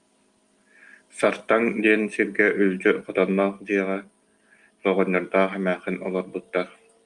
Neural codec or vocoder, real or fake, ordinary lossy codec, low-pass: none; real; Opus, 32 kbps; 10.8 kHz